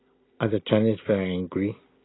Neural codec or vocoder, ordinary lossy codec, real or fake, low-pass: none; AAC, 16 kbps; real; 7.2 kHz